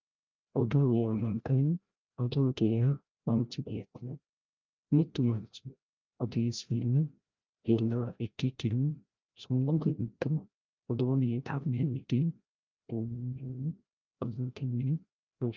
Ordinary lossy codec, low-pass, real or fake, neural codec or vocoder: Opus, 24 kbps; 7.2 kHz; fake; codec, 16 kHz, 0.5 kbps, FreqCodec, larger model